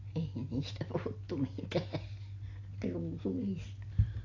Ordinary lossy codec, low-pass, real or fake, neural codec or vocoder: AAC, 32 kbps; 7.2 kHz; real; none